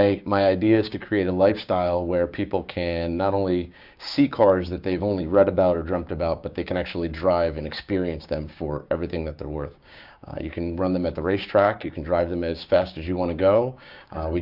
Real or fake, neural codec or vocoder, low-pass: fake; codec, 16 kHz, 6 kbps, DAC; 5.4 kHz